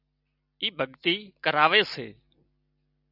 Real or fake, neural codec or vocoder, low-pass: real; none; 5.4 kHz